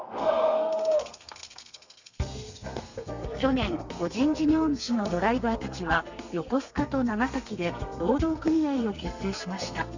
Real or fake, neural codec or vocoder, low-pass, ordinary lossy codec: fake; codec, 32 kHz, 1.9 kbps, SNAC; 7.2 kHz; Opus, 64 kbps